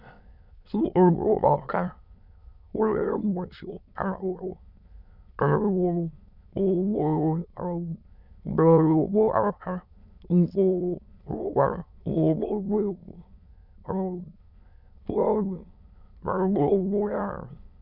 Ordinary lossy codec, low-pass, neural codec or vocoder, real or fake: none; 5.4 kHz; autoencoder, 22.05 kHz, a latent of 192 numbers a frame, VITS, trained on many speakers; fake